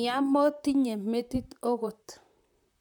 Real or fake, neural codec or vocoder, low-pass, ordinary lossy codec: fake; vocoder, 44.1 kHz, 128 mel bands, Pupu-Vocoder; 19.8 kHz; none